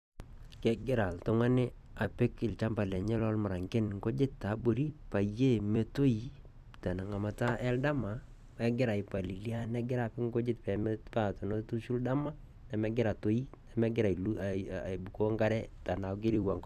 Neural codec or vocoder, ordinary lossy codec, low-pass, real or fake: vocoder, 44.1 kHz, 128 mel bands every 256 samples, BigVGAN v2; none; 14.4 kHz; fake